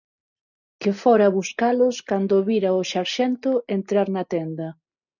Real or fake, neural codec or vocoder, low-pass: real; none; 7.2 kHz